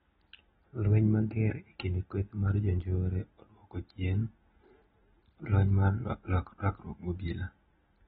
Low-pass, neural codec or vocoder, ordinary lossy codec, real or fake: 19.8 kHz; none; AAC, 16 kbps; real